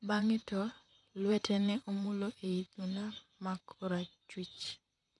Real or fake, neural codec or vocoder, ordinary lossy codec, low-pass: fake; vocoder, 44.1 kHz, 128 mel bands, Pupu-Vocoder; none; 10.8 kHz